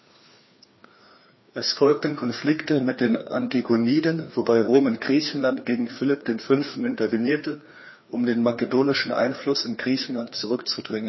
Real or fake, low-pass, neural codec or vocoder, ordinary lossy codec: fake; 7.2 kHz; codec, 16 kHz, 2 kbps, FreqCodec, larger model; MP3, 24 kbps